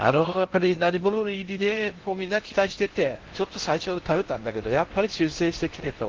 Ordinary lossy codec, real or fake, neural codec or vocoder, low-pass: Opus, 16 kbps; fake; codec, 16 kHz in and 24 kHz out, 0.6 kbps, FocalCodec, streaming, 4096 codes; 7.2 kHz